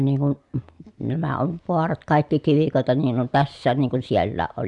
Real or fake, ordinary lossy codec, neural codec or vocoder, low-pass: real; MP3, 96 kbps; none; 10.8 kHz